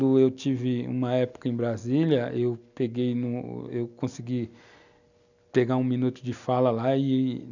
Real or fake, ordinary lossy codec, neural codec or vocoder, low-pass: real; none; none; 7.2 kHz